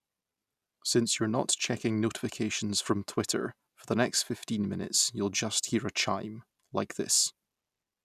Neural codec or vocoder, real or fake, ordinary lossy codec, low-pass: none; real; none; 14.4 kHz